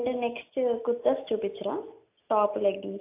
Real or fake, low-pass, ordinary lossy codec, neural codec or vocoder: real; 3.6 kHz; AAC, 24 kbps; none